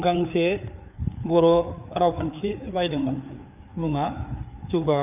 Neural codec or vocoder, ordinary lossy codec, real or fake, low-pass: codec, 16 kHz, 4 kbps, FreqCodec, larger model; none; fake; 3.6 kHz